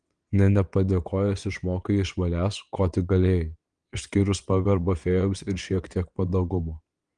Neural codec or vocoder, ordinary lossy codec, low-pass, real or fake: vocoder, 22.05 kHz, 80 mel bands, WaveNeXt; Opus, 24 kbps; 9.9 kHz; fake